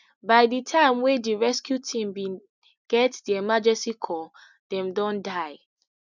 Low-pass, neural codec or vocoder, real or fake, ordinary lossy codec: 7.2 kHz; none; real; none